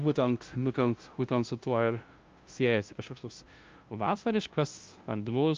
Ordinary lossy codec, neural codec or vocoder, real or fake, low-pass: Opus, 24 kbps; codec, 16 kHz, 0.5 kbps, FunCodec, trained on LibriTTS, 25 frames a second; fake; 7.2 kHz